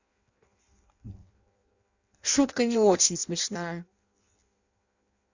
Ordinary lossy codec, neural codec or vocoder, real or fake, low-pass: Opus, 64 kbps; codec, 16 kHz in and 24 kHz out, 0.6 kbps, FireRedTTS-2 codec; fake; 7.2 kHz